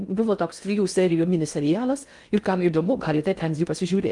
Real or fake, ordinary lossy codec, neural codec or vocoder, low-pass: fake; Opus, 32 kbps; codec, 16 kHz in and 24 kHz out, 0.6 kbps, FocalCodec, streaming, 2048 codes; 10.8 kHz